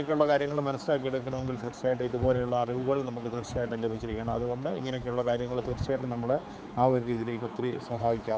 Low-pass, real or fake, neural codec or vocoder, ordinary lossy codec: none; fake; codec, 16 kHz, 4 kbps, X-Codec, HuBERT features, trained on general audio; none